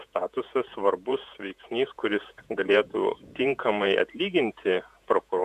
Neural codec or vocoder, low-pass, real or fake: vocoder, 48 kHz, 128 mel bands, Vocos; 14.4 kHz; fake